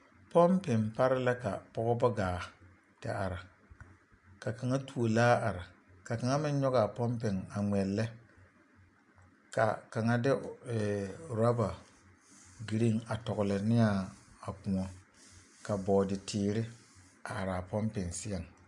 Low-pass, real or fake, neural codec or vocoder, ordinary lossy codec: 10.8 kHz; real; none; MP3, 64 kbps